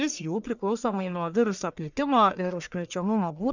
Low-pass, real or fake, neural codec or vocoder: 7.2 kHz; fake; codec, 44.1 kHz, 1.7 kbps, Pupu-Codec